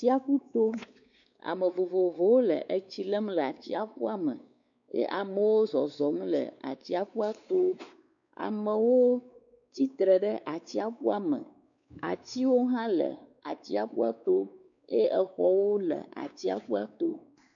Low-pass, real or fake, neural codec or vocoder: 7.2 kHz; fake; codec, 16 kHz, 4 kbps, X-Codec, WavLM features, trained on Multilingual LibriSpeech